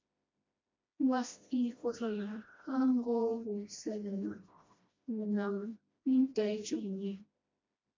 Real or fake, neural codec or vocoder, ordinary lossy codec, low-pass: fake; codec, 16 kHz, 1 kbps, FreqCodec, smaller model; MP3, 48 kbps; 7.2 kHz